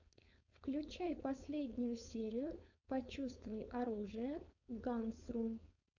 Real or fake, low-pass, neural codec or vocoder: fake; 7.2 kHz; codec, 16 kHz, 4.8 kbps, FACodec